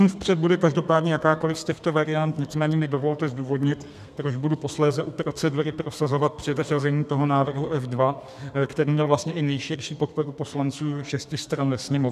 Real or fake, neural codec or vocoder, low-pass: fake; codec, 32 kHz, 1.9 kbps, SNAC; 14.4 kHz